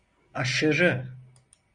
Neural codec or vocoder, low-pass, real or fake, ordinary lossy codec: none; 9.9 kHz; real; Opus, 64 kbps